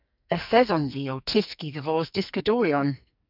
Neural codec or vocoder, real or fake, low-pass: codec, 44.1 kHz, 2.6 kbps, SNAC; fake; 5.4 kHz